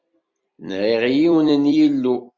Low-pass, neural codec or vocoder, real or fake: 7.2 kHz; vocoder, 44.1 kHz, 128 mel bands every 256 samples, BigVGAN v2; fake